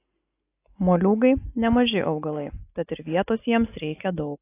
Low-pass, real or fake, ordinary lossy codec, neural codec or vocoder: 3.6 kHz; real; AAC, 24 kbps; none